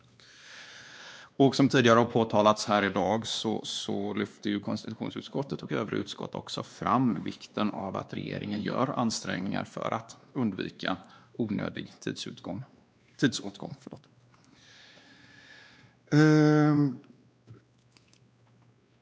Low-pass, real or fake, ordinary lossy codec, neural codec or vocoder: none; fake; none; codec, 16 kHz, 2 kbps, X-Codec, WavLM features, trained on Multilingual LibriSpeech